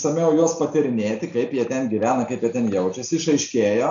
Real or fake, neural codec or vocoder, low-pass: real; none; 7.2 kHz